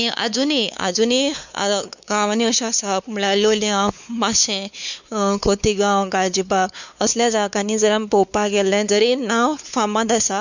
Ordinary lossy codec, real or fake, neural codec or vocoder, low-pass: none; fake; codec, 16 kHz, 4 kbps, X-Codec, WavLM features, trained on Multilingual LibriSpeech; 7.2 kHz